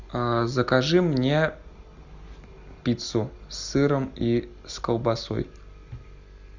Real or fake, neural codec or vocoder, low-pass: real; none; 7.2 kHz